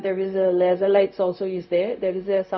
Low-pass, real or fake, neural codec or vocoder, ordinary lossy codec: 7.2 kHz; fake; codec, 16 kHz, 0.4 kbps, LongCat-Audio-Codec; none